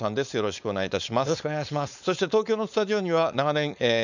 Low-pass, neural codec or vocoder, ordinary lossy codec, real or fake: 7.2 kHz; codec, 16 kHz, 4.8 kbps, FACodec; none; fake